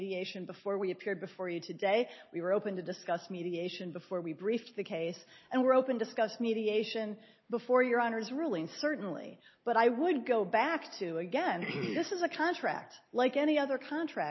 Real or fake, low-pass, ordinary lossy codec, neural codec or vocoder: real; 7.2 kHz; MP3, 24 kbps; none